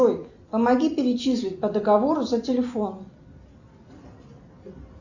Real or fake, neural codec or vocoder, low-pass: real; none; 7.2 kHz